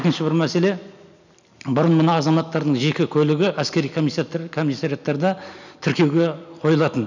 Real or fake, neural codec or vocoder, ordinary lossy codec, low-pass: real; none; none; 7.2 kHz